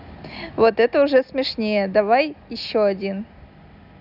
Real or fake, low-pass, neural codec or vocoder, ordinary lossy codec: real; 5.4 kHz; none; none